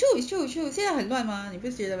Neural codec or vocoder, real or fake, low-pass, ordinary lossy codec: none; real; none; none